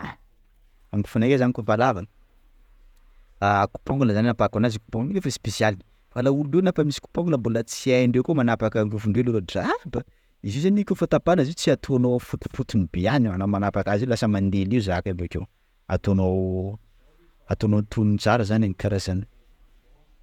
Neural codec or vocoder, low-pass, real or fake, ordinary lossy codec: vocoder, 48 kHz, 128 mel bands, Vocos; 19.8 kHz; fake; none